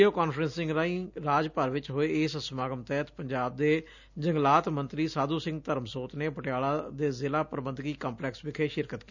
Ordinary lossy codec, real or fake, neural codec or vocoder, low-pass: none; real; none; 7.2 kHz